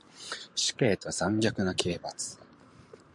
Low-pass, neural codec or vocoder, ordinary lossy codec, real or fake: 10.8 kHz; vocoder, 44.1 kHz, 128 mel bands every 512 samples, BigVGAN v2; MP3, 96 kbps; fake